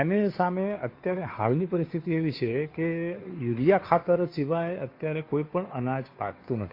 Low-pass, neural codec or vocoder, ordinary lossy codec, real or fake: 5.4 kHz; codec, 16 kHz, 2 kbps, FunCodec, trained on Chinese and English, 25 frames a second; AAC, 32 kbps; fake